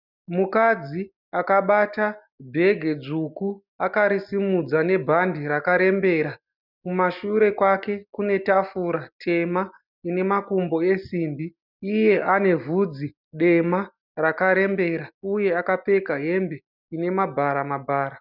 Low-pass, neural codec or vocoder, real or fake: 5.4 kHz; none; real